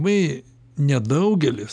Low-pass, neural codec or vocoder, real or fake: 9.9 kHz; none; real